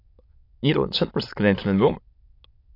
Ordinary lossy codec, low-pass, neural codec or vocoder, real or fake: AAC, 32 kbps; 5.4 kHz; autoencoder, 22.05 kHz, a latent of 192 numbers a frame, VITS, trained on many speakers; fake